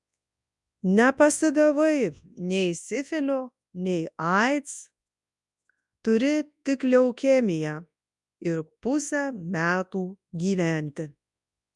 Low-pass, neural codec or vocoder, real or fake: 10.8 kHz; codec, 24 kHz, 0.9 kbps, WavTokenizer, large speech release; fake